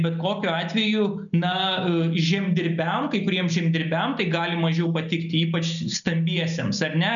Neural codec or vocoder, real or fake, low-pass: none; real; 7.2 kHz